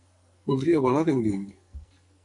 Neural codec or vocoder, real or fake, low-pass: codec, 44.1 kHz, 2.6 kbps, SNAC; fake; 10.8 kHz